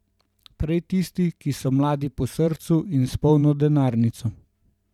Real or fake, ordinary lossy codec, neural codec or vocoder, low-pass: fake; none; vocoder, 44.1 kHz, 128 mel bands every 512 samples, BigVGAN v2; 19.8 kHz